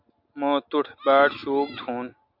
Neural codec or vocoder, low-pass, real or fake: none; 5.4 kHz; real